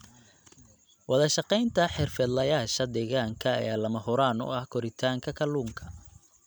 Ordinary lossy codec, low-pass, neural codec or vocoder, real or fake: none; none; none; real